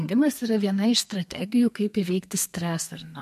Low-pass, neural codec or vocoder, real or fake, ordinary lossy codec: 14.4 kHz; codec, 32 kHz, 1.9 kbps, SNAC; fake; MP3, 64 kbps